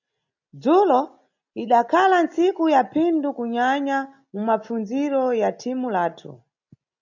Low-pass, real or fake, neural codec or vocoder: 7.2 kHz; real; none